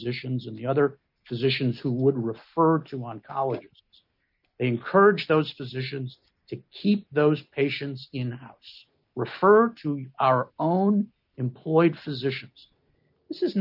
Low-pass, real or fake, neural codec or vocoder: 5.4 kHz; real; none